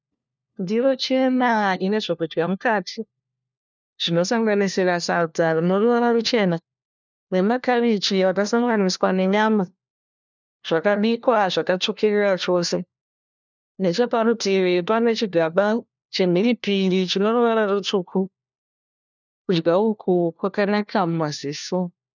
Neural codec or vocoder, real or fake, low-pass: codec, 16 kHz, 1 kbps, FunCodec, trained on LibriTTS, 50 frames a second; fake; 7.2 kHz